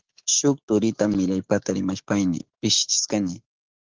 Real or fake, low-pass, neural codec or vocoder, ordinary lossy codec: fake; 7.2 kHz; autoencoder, 48 kHz, 128 numbers a frame, DAC-VAE, trained on Japanese speech; Opus, 16 kbps